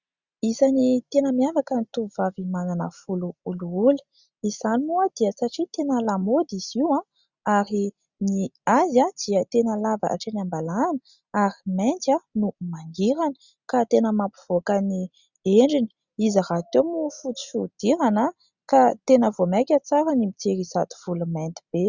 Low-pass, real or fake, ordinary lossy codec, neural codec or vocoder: 7.2 kHz; real; Opus, 64 kbps; none